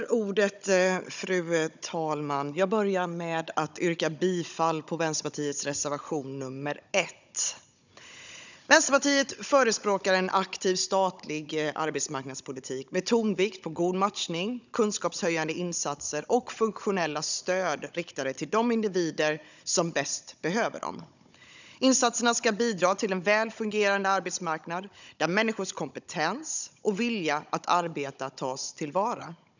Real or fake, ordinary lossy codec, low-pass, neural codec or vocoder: fake; none; 7.2 kHz; codec, 16 kHz, 16 kbps, FunCodec, trained on Chinese and English, 50 frames a second